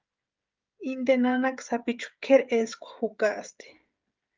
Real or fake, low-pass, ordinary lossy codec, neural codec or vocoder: fake; 7.2 kHz; Opus, 24 kbps; codec, 16 kHz, 16 kbps, FreqCodec, smaller model